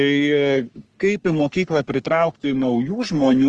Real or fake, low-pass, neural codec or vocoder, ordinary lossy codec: fake; 10.8 kHz; codec, 44.1 kHz, 3.4 kbps, Pupu-Codec; Opus, 24 kbps